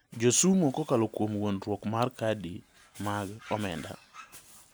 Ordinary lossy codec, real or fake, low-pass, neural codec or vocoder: none; real; none; none